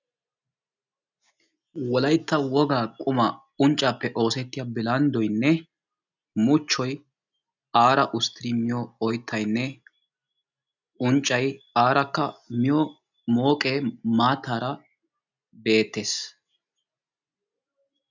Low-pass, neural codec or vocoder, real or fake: 7.2 kHz; none; real